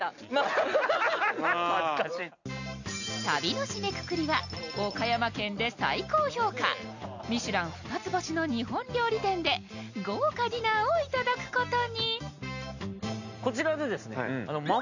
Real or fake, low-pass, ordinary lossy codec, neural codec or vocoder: real; 7.2 kHz; none; none